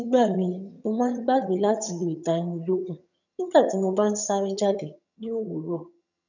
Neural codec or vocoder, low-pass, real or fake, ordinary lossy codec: vocoder, 22.05 kHz, 80 mel bands, HiFi-GAN; 7.2 kHz; fake; none